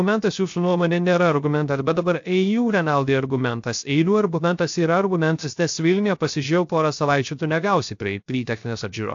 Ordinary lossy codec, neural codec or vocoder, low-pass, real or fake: AAC, 64 kbps; codec, 16 kHz, 0.3 kbps, FocalCodec; 7.2 kHz; fake